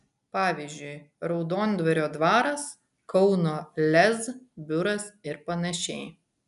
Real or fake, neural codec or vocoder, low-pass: real; none; 10.8 kHz